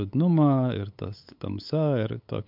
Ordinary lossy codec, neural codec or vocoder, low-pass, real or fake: MP3, 48 kbps; codec, 16 kHz, 8 kbps, FunCodec, trained on LibriTTS, 25 frames a second; 5.4 kHz; fake